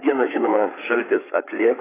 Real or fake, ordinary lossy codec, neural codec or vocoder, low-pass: fake; AAC, 16 kbps; codec, 16 kHz, 8 kbps, FreqCodec, larger model; 3.6 kHz